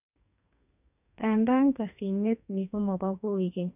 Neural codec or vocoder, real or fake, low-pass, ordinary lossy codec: codec, 44.1 kHz, 2.6 kbps, SNAC; fake; 3.6 kHz; none